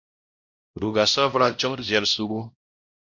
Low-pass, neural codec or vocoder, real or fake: 7.2 kHz; codec, 16 kHz, 0.5 kbps, X-Codec, WavLM features, trained on Multilingual LibriSpeech; fake